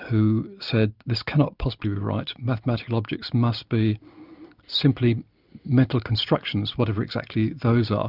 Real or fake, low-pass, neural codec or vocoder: real; 5.4 kHz; none